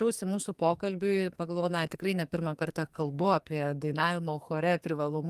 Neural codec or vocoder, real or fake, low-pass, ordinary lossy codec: codec, 44.1 kHz, 2.6 kbps, SNAC; fake; 14.4 kHz; Opus, 32 kbps